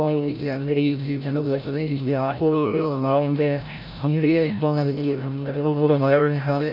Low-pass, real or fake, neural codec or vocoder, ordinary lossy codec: 5.4 kHz; fake; codec, 16 kHz, 0.5 kbps, FreqCodec, larger model; none